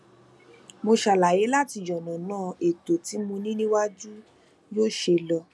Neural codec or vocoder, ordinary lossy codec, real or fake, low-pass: none; none; real; none